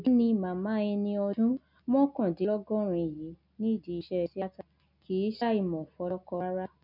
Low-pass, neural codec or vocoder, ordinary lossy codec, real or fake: 5.4 kHz; none; none; real